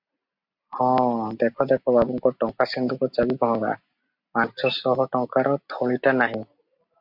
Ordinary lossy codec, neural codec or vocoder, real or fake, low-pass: MP3, 48 kbps; none; real; 5.4 kHz